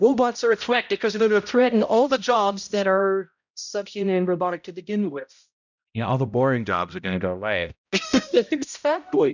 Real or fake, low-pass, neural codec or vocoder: fake; 7.2 kHz; codec, 16 kHz, 0.5 kbps, X-Codec, HuBERT features, trained on balanced general audio